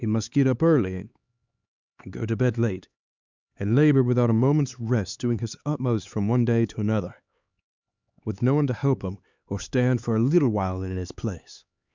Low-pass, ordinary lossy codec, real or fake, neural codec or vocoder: 7.2 kHz; Opus, 64 kbps; fake; codec, 16 kHz, 4 kbps, X-Codec, HuBERT features, trained on LibriSpeech